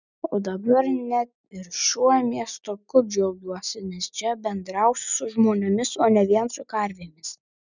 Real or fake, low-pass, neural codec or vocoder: real; 7.2 kHz; none